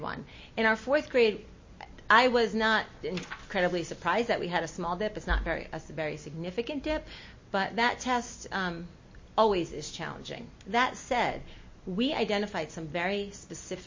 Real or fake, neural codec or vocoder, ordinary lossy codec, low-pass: real; none; MP3, 32 kbps; 7.2 kHz